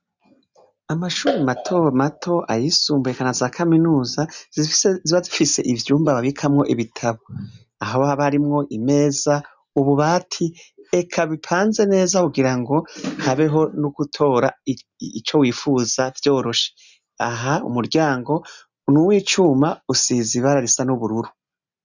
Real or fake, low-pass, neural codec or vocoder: real; 7.2 kHz; none